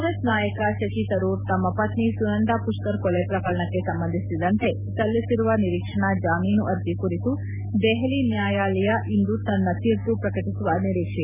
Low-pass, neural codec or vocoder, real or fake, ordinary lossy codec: 3.6 kHz; none; real; none